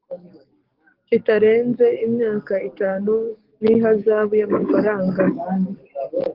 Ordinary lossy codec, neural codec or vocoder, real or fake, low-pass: Opus, 16 kbps; codec, 44.1 kHz, 7.8 kbps, DAC; fake; 5.4 kHz